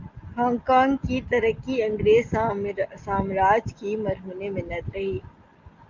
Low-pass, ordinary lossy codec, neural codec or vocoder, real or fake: 7.2 kHz; Opus, 24 kbps; none; real